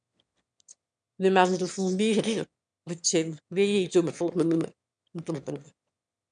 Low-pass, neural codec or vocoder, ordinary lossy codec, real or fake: 9.9 kHz; autoencoder, 22.05 kHz, a latent of 192 numbers a frame, VITS, trained on one speaker; MP3, 96 kbps; fake